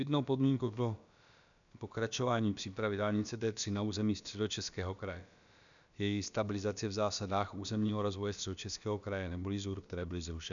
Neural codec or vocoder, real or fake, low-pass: codec, 16 kHz, about 1 kbps, DyCAST, with the encoder's durations; fake; 7.2 kHz